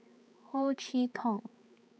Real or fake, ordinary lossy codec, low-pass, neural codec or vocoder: fake; none; none; codec, 16 kHz, 4 kbps, X-Codec, HuBERT features, trained on balanced general audio